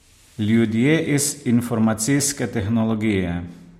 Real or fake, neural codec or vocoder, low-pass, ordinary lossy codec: real; none; 19.8 kHz; MP3, 64 kbps